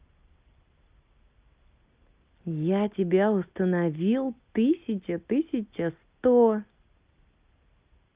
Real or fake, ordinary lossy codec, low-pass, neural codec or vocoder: real; Opus, 24 kbps; 3.6 kHz; none